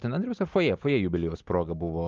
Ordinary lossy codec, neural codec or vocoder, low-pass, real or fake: Opus, 32 kbps; none; 7.2 kHz; real